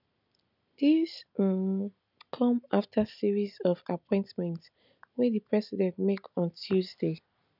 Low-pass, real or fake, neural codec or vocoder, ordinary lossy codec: 5.4 kHz; real; none; none